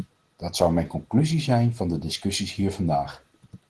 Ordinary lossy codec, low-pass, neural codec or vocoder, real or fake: Opus, 16 kbps; 10.8 kHz; none; real